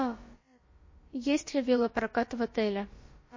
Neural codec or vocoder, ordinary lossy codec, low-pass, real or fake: codec, 16 kHz, about 1 kbps, DyCAST, with the encoder's durations; MP3, 32 kbps; 7.2 kHz; fake